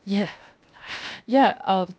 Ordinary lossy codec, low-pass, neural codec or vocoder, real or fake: none; none; codec, 16 kHz, 0.7 kbps, FocalCodec; fake